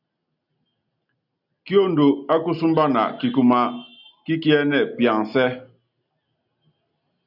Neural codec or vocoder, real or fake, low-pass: none; real; 5.4 kHz